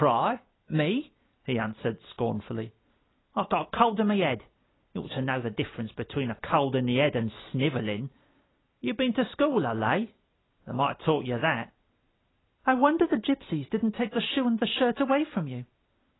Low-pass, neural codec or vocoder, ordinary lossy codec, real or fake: 7.2 kHz; none; AAC, 16 kbps; real